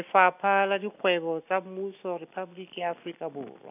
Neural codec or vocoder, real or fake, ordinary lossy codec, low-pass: none; real; none; 3.6 kHz